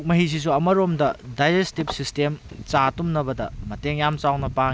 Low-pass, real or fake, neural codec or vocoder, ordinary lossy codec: none; real; none; none